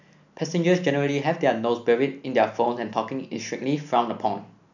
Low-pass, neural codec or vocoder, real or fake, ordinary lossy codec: 7.2 kHz; none; real; none